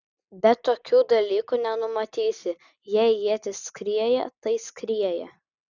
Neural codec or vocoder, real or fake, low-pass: none; real; 7.2 kHz